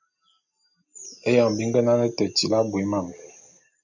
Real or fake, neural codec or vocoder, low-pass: real; none; 7.2 kHz